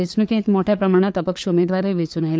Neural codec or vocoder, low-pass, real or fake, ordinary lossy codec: codec, 16 kHz, 4 kbps, FunCodec, trained on LibriTTS, 50 frames a second; none; fake; none